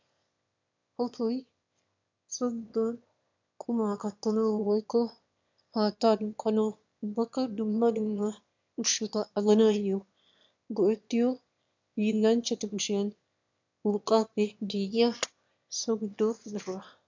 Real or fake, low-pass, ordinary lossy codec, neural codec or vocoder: fake; 7.2 kHz; MP3, 64 kbps; autoencoder, 22.05 kHz, a latent of 192 numbers a frame, VITS, trained on one speaker